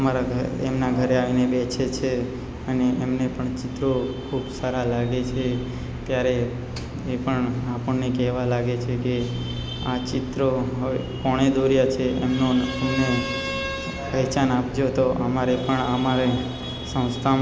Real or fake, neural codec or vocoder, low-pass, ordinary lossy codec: real; none; none; none